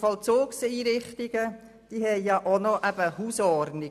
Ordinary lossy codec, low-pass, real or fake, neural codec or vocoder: none; 14.4 kHz; real; none